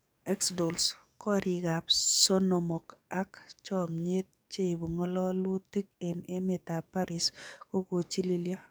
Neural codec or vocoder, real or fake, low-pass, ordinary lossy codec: codec, 44.1 kHz, 7.8 kbps, DAC; fake; none; none